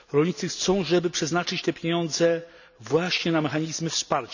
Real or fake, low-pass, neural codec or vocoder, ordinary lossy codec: real; 7.2 kHz; none; none